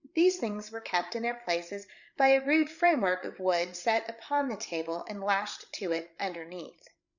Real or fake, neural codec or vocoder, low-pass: fake; codec, 16 kHz, 8 kbps, FreqCodec, larger model; 7.2 kHz